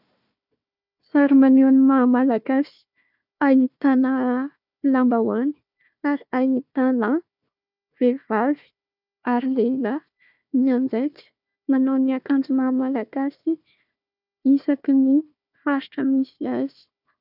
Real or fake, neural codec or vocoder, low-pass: fake; codec, 16 kHz, 1 kbps, FunCodec, trained on Chinese and English, 50 frames a second; 5.4 kHz